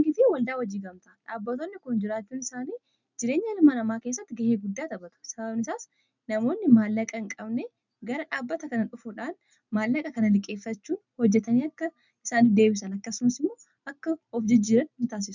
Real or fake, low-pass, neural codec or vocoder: real; 7.2 kHz; none